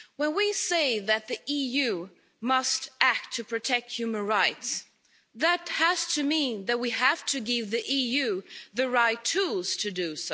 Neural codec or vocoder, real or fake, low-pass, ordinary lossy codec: none; real; none; none